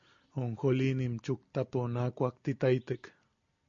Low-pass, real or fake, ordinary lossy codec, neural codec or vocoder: 7.2 kHz; real; MP3, 48 kbps; none